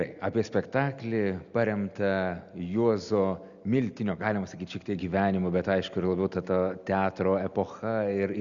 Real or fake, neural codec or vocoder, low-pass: real; none; 7.2 kHz